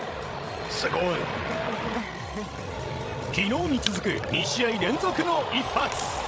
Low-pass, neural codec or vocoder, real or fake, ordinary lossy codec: none; codec, 16 kHz, 16 kbps, FreqCodec, larger model; fake; none